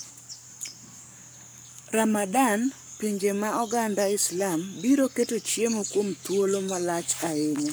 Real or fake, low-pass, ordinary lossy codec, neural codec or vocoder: fake; none; none; codec, 44.1 kHz, 7.8 kbps, Pupu-Codec